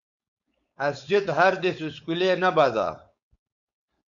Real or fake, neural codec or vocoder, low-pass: fake; codec, 16 kHz, 4.8 kbps, FACodec; 7.2 kHz